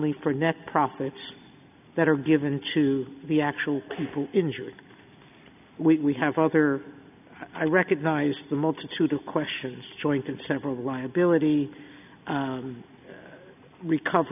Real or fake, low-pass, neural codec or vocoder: real; 3.6 kHz; none